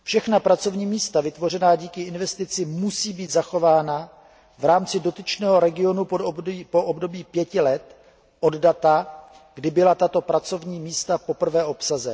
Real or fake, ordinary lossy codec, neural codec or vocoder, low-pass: real; none; none; none